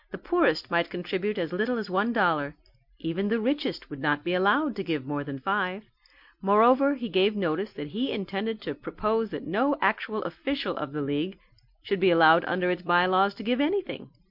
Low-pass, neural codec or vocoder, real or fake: 5.4 kHz; none; real